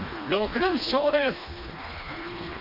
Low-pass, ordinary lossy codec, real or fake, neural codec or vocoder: 5.4 kHz; none; fake; codec, 16 kHz, 2 kbps, FreqCodec, smaller model